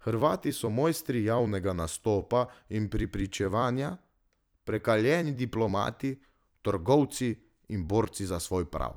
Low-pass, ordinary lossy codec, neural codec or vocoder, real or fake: none; none; vocoder, 44.1 kHz, 128 mel bands every 256 samples, BigVGAN v2; fake